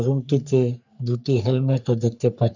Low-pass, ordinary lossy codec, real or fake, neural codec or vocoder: 7.2 kHz; none; fake; codec, 44.1 kHz, 3.4 kbps, Pupu-Codec